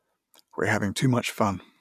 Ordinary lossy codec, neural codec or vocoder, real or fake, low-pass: none; none; real; 14.4 kHz